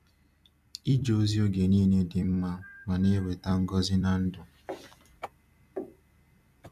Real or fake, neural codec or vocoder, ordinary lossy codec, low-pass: fake; vocoder, 44.1 kHz, 128 mel bands every 256 samples, BigVGAN v2; none; 14.4 kHz